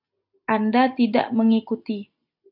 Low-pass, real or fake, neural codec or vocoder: 5.4 kHz; real; none